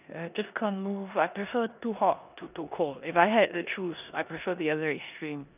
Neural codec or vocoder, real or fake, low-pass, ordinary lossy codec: codec, 16 kHz in and 24 kHz out, 0.9 kbps, LongCat-Audio-Codec, four codebook decoder; fake; 3.6 kHz; none